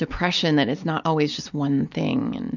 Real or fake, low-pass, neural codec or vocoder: real; 7.2 kHz; none